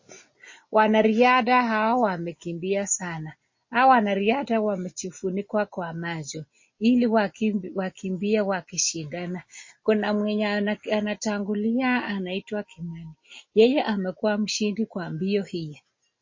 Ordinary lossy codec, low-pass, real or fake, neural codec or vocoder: MP3, 32 kbps; 7.2 kHz; real; none